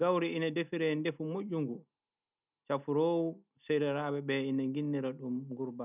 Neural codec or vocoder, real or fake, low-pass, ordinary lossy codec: none; real; 3.6 kHz; none